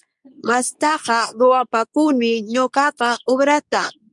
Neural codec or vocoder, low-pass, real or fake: codec, 24 kHz, 0.9 kbps, WavTokenizer, medium speech release version 2; 10.8 kHz; fake